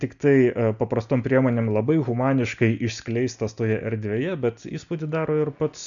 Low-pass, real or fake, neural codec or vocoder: 7.2 kHz; real; none